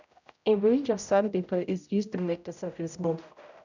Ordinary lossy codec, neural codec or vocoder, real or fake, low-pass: none; codec, 16 kHz, 0.5 kbps, X-Codec, HuBERT features, trained on general audio; fake; 7.2 kHz